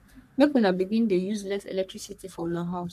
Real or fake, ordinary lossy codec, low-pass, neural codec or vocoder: fake; none; 14.4 kHz; codec, 44.1 kHz, 3.4 kbps, Pupu-Codec